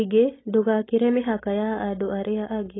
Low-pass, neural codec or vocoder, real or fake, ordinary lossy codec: 7.2 kHz; none; real; AAC, 16 kbps